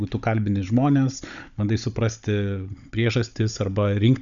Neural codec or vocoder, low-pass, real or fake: codec, 16 kHz, 16 kbps, FunCodec, trained on Chinese and English, 50 frames a second; 7.2 kHz; fake